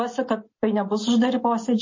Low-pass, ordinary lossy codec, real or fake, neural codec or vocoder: 7.2 kHz; MP3, 32 kbps; real; none